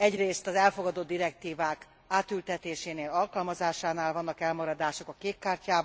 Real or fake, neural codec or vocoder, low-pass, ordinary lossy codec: real; none; none; none